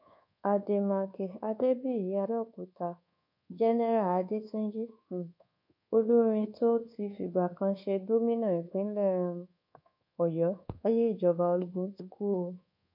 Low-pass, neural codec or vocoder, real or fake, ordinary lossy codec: 5.4 kHz; codec, 24 kHz, 1.2 kbps, DualCodec; fake; none